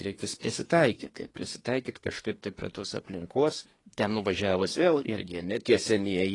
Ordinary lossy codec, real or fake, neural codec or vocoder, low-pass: AAC, 32 kbps; fake; codec, 24 kHz, 1 kbps, SNAC; 10.8 kHz